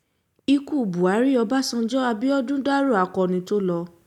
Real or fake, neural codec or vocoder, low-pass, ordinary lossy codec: real; none; 19.8 kHz; none